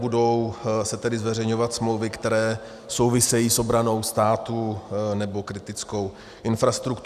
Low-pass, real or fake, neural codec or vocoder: 14.4 kHz; real; none